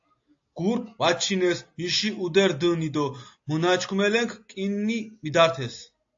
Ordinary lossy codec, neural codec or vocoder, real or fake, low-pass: AAC, 48 kbps; none; real; 7.2 kHz